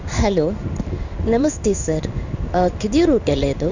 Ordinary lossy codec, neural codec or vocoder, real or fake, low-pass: none; codec, 16 kHz in and 24 kHz out, 1 kbps, XY-Tokenizer; fake; 7.2 kHz